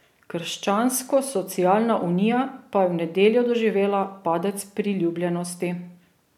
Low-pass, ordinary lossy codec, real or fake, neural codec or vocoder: 19.8 kHz; none; real; none